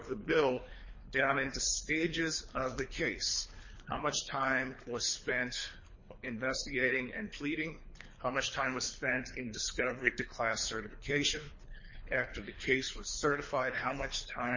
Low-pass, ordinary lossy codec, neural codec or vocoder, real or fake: 7.2 kHz; MP3, 32 kbps; codec, 24 kHz, 3 kbps, HILCodec; fake